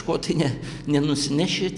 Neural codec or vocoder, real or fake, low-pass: none; real; 10.8 kHz